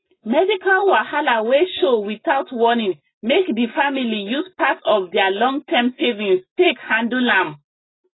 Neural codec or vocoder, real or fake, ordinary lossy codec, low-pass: none; real; AAC, 16 kbps; 7.2 kHz